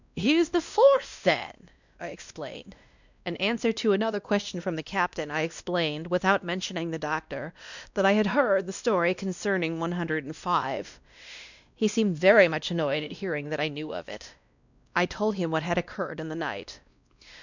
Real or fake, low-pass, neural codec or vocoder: fake; 7.2 kHz; codec, 16 kHz, 1 kbps, X-Codec, WavLM features, trained on Multilingual LibriSpeech